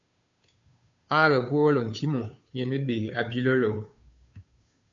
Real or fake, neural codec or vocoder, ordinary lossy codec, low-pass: fake; codec, 16 kHz, 2 kbps, FunCodec, trained on Chinese and English, 25 frames a second; AAC, 64 kbps; 7.2 kHz